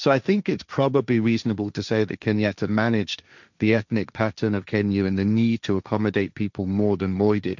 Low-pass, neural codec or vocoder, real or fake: 7.2 kHz; codec, 16 kHz, 1.1 kbps, Voila-Tokenizer; fake